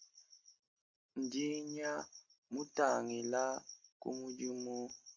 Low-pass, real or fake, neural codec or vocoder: 7.2 kHz; real; none